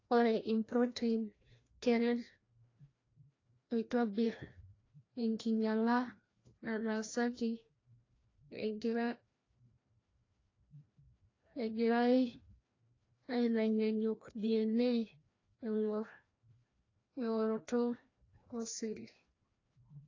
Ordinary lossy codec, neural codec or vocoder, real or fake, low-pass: AAC, 32 kbps; codec, 16 kHz, 1 kbps, FreqCodec, larger model; fake; 7.2 kHz